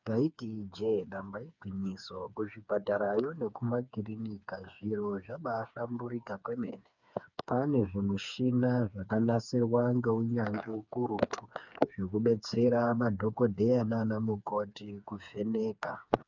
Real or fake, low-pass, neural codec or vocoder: fake; 7.2 kHz; codec, 16 kHz, 4 kbps, FreqCodec, smaller model